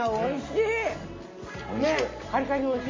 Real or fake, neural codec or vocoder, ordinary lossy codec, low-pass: real; none; MP3, 32 kbps; 7.2 kHz